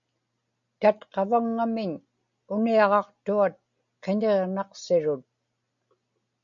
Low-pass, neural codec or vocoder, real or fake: 7.2 kHz; none; real